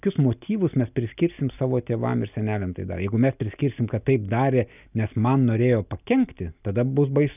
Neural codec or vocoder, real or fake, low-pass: none; real; 3.6 kHz